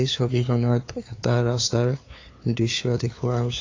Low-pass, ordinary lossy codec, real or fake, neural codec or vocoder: 7.2 kHz; AAC, 32 kbps; fake; codec, 16 kHz, 2 kbps, FunCodec, trained on LibriTTS, 25 frames a second